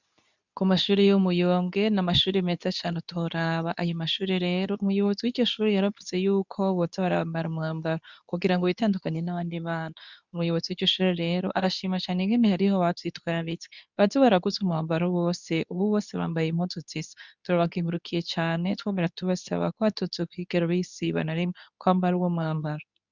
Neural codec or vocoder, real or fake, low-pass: codec, 24 kHz, 0.9 kbps, WavTokenizer, medium speech release version 2; fake; 7.2 kHz